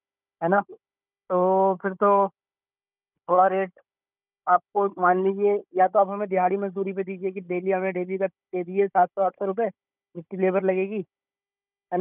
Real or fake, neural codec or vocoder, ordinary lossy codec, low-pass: fake; codec, 16 kHz, 16 kbps, FunCodec, trained on Chinese and English, 50 frames a second; none; 3.6 kHz